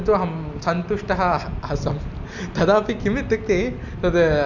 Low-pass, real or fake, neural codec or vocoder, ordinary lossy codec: 7.2 kHz; real; none; none